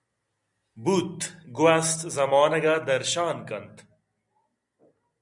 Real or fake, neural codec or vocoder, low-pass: real; none; 10.8 kHz